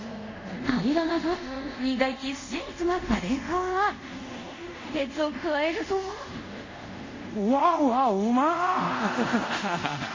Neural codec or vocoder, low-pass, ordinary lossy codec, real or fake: codec, 24 kHz, 0.5 kbps, DualCodec; 7.2 kHz; MP3, 32 kbps; fake